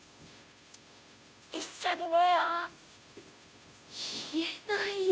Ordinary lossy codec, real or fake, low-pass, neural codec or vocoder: none; fake; none; codec, 16 kHz, 0.5 kbps, FunCodec, trained on Chinese and English, 25 frames a second